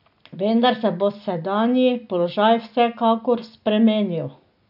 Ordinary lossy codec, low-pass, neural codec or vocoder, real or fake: AAC, 48 kbps; 5.4 kHz; none; real